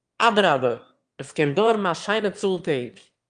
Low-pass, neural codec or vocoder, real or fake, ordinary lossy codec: 9.9 kHz; autoencoder, 22.05 kHz, a latent of 192 numbers a frame, VITS, trained on one speaker; fake; Opus, 32 kbps